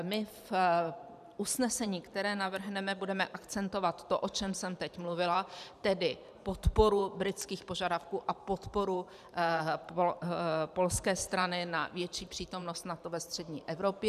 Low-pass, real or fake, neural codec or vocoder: 14.4 kHz; fake; vocoder, 44.1 kHz, 128 mel bands every 512 samples, BigVGAN v2